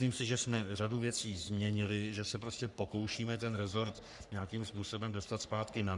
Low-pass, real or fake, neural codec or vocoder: 10.8 kHz; fake; codec, 44.1 kHz, 3.4 kbps, Pupu-Codec